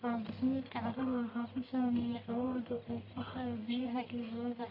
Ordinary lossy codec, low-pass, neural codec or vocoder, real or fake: none; 5.4 kHz; codec, 44.1 kHz, 1.7 kbps, Pupu-Codec; fake